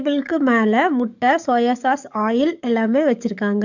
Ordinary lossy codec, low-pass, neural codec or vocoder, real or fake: none; 7.2 kHz; codec, 44.1 kHz, 7.8 kbps, DAC; fake